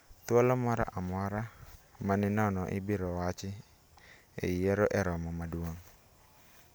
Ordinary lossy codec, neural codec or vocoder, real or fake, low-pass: none; none; real; none